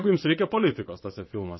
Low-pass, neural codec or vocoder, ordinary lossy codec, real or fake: 7.2 kHz; vocoder, 22.05 kHz, 80 mel bands, WaveNeXt; MP3, 24 kbps; fake